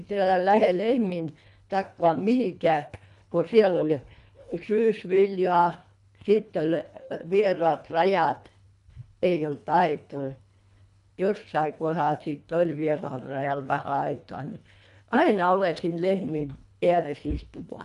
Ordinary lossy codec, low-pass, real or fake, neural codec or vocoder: none; 10.8 kHz; fake; codec, 24 kHz, 1.5 kbps, HILCodec